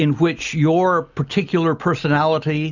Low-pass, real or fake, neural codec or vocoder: 7.2 kHz; real; none